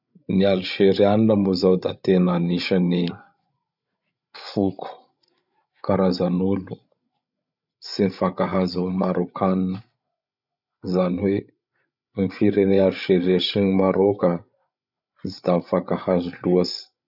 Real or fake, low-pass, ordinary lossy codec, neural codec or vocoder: fake; 5.4 kHz; none; codec, 16 kHz, 16 kbps, FreqCodec, larger model